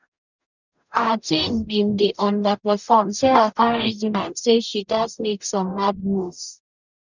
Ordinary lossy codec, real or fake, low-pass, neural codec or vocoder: none; fake; 7.2 kHz; codec, 44.1 kHz, 0.9 kbps, DAC